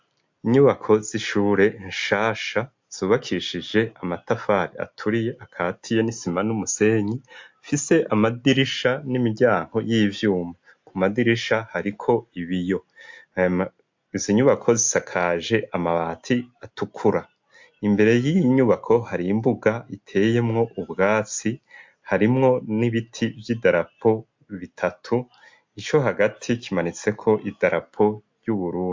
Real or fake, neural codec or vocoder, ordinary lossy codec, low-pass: real; none; MP3, 48 kbps; 7.2 kHz